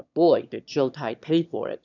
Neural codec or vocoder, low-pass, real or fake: autoencoder, 22.05 kHz, a latent of 192 numbers a frame, VITS, trained on one speaker; 7.2 kHz; fake